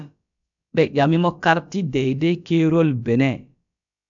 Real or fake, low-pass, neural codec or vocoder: fake; 7.2 kHz; codec, 16 kHz, about 1 kbps, DyCAST, with the encoder's durations